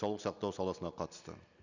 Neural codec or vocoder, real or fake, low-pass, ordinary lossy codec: none; real; 7.2 kHz; none